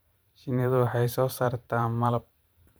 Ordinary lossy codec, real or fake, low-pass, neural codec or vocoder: none; fake; none; vocoder, 44.1 kHz, 128 mel bands every 512 samples, BigVGAN v2